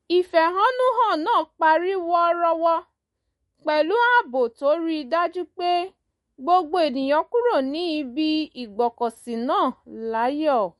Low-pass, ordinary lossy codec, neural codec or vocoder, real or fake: 19.8 kHz; MP3, 48 kbps; autoencoder, 48 kHz, 128 numbers a frame, DAC-VAE, trained on Japanese speech; fake